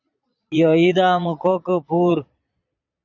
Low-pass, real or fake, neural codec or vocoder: 7.2 kHz; fake; vocoder, 22.05 kHz, 80 mel bands, Vocos